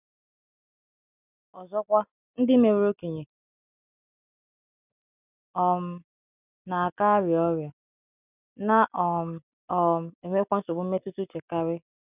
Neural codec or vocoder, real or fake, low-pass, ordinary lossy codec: none; real; 3.6 kHz; none